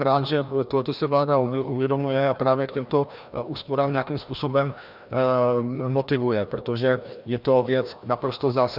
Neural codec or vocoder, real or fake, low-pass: codec, 16 kHz, 1 kbps, FreqCodec, larger model; fake; 5.4 kHz